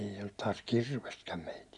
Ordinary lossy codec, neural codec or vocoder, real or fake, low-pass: none; none; real; 10.8 kHz